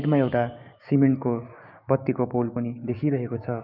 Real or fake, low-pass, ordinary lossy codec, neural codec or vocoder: fake; 5.4 kHz; none; codec, 16 kHz, 6 kbps, DAC